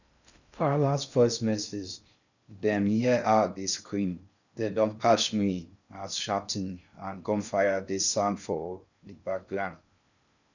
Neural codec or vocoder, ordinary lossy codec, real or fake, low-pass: codec, 16 kHz in and 24 kHz out, 0.6 kbps, FocalCodec, streaming, 2048 codes; none; fake; 7.2 kHz